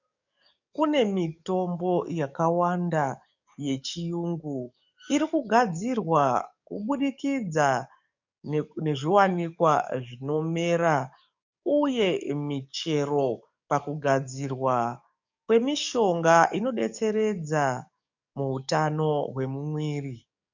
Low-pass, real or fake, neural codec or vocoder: 7.2 kHz; fake; codec, 44.1 kHz, 7.8 kbps, DAC